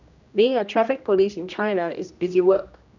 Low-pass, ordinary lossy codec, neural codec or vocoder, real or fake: 7.2 kHz; none; codec, 16 kHz, 1 kbps, X-Codec, HuBERT features, trained on general audio; fake